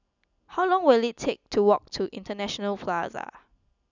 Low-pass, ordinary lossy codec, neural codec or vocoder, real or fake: 7.2 kHz; none; none; real